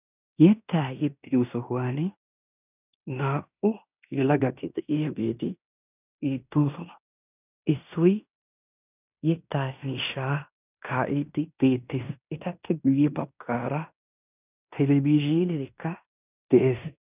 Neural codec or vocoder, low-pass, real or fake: codec, 16 kHz in and 24 kHz out, 0.9 kbps, LongCat-Audio-Codec, fine tuned four codebook decoder; 3.6 kHz; fake